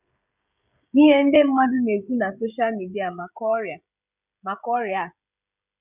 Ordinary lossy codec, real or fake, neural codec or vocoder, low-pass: none; fake; codec, 16 kHz, 16 kbps, FreqCodec, smaller model; 3.6 kHz